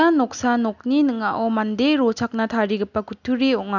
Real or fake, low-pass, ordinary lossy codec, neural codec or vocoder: real; 7.2 kHz; none; none